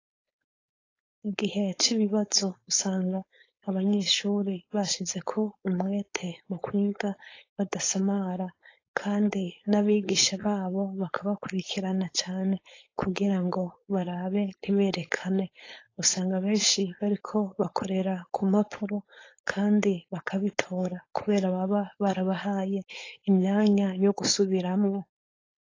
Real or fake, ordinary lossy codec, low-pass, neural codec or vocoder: fake; AAC, 32 kbps; 7.2 kHz; codec, 16 kHz, 4.8 kbps, FACodec